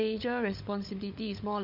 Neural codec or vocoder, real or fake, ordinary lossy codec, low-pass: codec, 16 kHz, 16 kbps, FunCodec, trained on LibriTTS, 50 frames a second; fake; none; 5.4 kHz